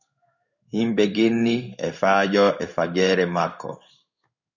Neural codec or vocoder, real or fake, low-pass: codec, 16 kHz in and 24 kHz out, 1 kbps, XY-Tokenizer; fake; 7.2 kHz